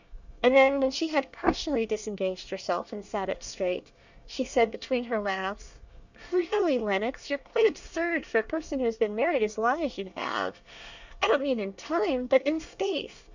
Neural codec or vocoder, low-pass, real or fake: codec, 24 kHz, 1 kbps, SNAC; 7.2 kHz; fake